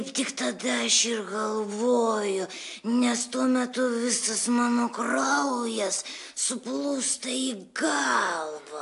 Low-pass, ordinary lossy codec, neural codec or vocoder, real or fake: 10.8 kHz; AAC, 64 kbps; none; real